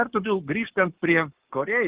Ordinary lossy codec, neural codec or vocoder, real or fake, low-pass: Opus, 16 kbps; codec, 44.1 kHz, 7.8 kbps, Pupu-Codec; fake; 3.6 kHz